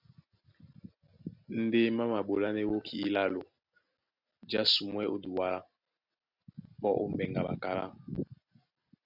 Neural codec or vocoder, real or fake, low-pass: none; real; 5.4 kHz